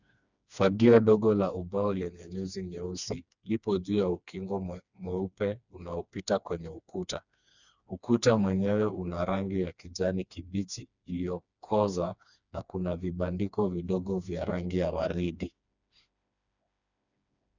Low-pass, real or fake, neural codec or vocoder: 7.2 kHz; fake; codec, 16 kHz, 2 kbps, FreqCodec, smaller model